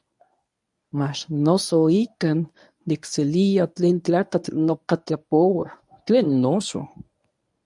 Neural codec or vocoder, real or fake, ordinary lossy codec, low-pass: codec, 24 kHz, 0.9 kbps, WavTokenizer, medium speech release version 1; fake; MP3, 96 kbps; 10.8 kHz